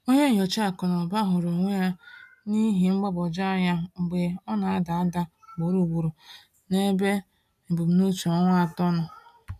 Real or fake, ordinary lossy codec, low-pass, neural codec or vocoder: real; none; 14.4 kHz; none